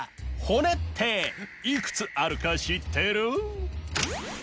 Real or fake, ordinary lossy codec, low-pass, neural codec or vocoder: real; none; none; none